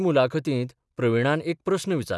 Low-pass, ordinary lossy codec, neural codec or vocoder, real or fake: none; none; none; real